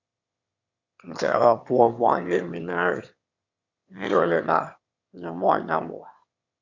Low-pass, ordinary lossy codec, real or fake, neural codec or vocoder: 7.2 kHz; Opus, 64 kbps; fake; autoencoder, 22.05 kHz, a latent of 192 numbers a frame, VITS, trained on one speaker